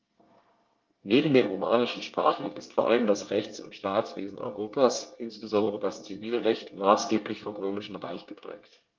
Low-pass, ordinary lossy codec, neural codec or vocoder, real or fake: 7.2 kHz; Opus, 32 kbps; codec, 24 kHz, 1 kbps, SNAC; fake